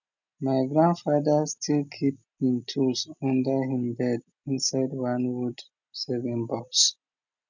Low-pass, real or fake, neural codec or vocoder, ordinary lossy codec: 7.2 kHz; real; none; none